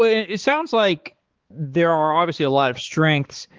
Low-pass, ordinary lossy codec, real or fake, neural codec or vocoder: 7.2 kHz; Opus, 16 kbps; fake; codec, 16 kHz, 4 kbps, X-Codec, HuBERT features, trained on balanced general audio